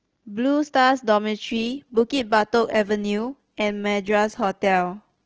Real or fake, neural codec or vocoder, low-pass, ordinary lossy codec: real; none; 7.2 kHz; Opus, 16 kbps